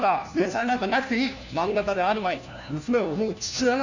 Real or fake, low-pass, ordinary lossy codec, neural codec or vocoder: fake; 7.2 kHz; none; codec, 16 kHz, 1 kbps, FunCodec, trained on LibriTTS, 50 frames a second